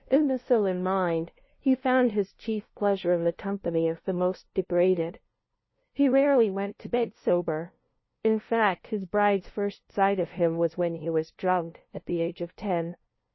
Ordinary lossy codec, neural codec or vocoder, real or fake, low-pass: MP3, 24 kbps; codec, 16 kHz, 0.5 kbps, FunCodec, trained on LibriTTS, 25 frames a second; fake; 7.2 kHz